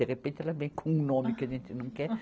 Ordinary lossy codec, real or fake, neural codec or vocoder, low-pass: none; real; none; none